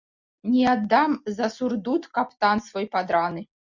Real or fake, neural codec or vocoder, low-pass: real; none; 7.2 kHz